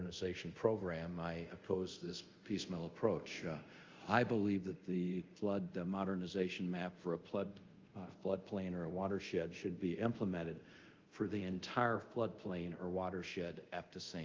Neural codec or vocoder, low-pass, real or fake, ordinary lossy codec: codec, 24 kHz, 0.5 kbps, DualCodec; 7.2 kHz; fake; Opus, 32 kbps